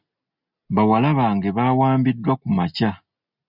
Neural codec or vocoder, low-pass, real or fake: none; 5.4 kHz; real